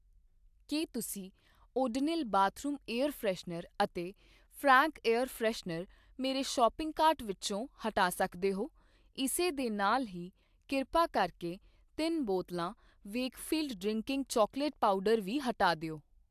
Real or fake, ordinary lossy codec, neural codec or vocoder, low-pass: real; AAC, 96 kbps; none; 14.4 kHz